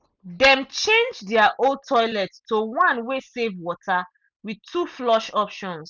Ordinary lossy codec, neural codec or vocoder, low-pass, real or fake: none; none; 7.2 kHz; real